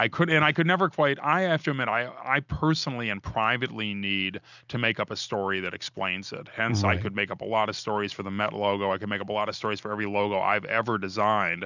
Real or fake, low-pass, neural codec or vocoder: real; 7.2 kHz; none